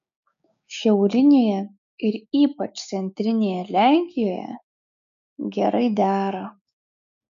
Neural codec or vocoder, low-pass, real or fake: codec, 16 kHz, 6 kbps, DAC; 7.2 kHz; fake